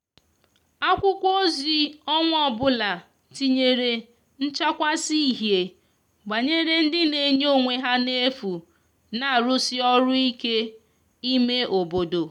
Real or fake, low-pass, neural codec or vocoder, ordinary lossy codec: real; 19.8 kHz; none; none